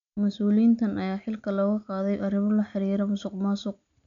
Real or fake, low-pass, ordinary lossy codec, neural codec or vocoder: real; 7.2 kHz; none; none